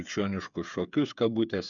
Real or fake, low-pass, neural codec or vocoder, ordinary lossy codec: fake; 7.2 kHz; codec, 16 kHz, 8 kbps, FreqCodec, smaller model; MP3, 96 kbps